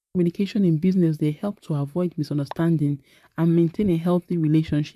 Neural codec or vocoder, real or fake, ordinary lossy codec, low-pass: vocoder, 44.1 kHz, 128 mel bands, Pupu-Vocoder; fake; MP3, 96 kbps; 14.4 kHz